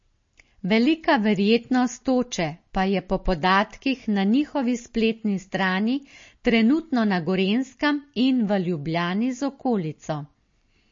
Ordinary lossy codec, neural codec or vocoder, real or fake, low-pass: MP3, 32 kbps; none; real; 7.2 kHz